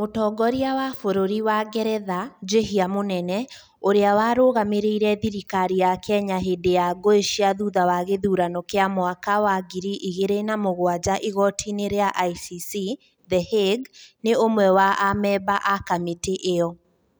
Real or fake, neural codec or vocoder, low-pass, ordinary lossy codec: real; none; none; none